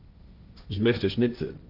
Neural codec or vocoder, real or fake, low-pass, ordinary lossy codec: codec, 16 kHz, 1.1 kbps, Voila-Tokenizer; fake; 5.4 kHz; Opus, 32 kbps